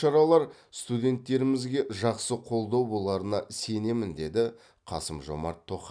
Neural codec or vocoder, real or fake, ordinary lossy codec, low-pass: none; real; none; 9.9 kHz